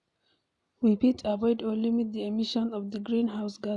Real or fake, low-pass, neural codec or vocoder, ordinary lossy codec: real; 10.8 kHz; none; none